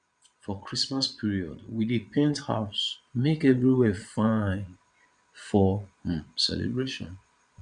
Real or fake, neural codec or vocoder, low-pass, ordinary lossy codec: fake; vocoder, 22.05 kHz, 80 mel bands, Vocos; 9.9 kHz; none